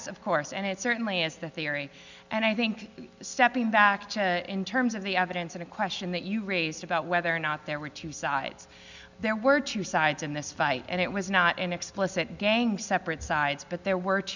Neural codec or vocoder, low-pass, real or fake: none; 7.2 kHz; real